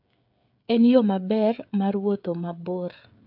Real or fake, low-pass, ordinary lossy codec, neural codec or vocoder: fake; 5.4 kHz; AAC, 48 kbps; codec, 16 kHz, 16 kbps, FreqCodec, smaller model